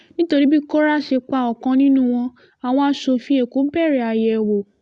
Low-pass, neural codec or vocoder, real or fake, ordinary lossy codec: 10.8 kHz; none; real; Opus, 64 kbps